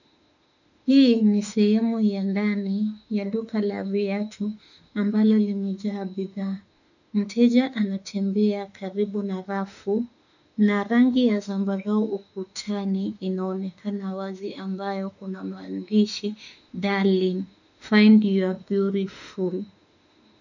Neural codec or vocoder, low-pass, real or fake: autoencoder, 48 kHz, 32 numbers a frame, DAC-VAE, trained on Japanese speech; 7.2 kHz; fake